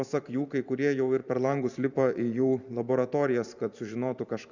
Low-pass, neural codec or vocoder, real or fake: 7.2 kHz; vocoder, 44.1 kHz, 128 mel bands every 512 samples, BigVGAN v2; fake